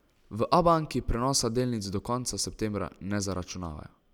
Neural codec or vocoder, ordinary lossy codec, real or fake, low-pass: none; none; real; 19.8 kHz